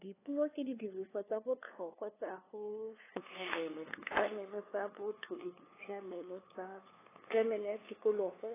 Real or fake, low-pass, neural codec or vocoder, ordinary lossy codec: fake; 3.6 kHz; codec, 16 kHz, 2 kbps, FunCodec, trained on LibriTTS, 25 frames a second; AAC, 16 kbps